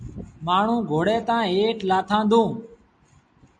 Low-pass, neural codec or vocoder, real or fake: 9.9 kHz; none; real